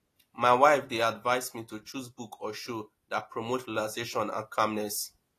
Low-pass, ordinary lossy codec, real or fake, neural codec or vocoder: 14.4 kHz; AAC, 48 kbps; real; none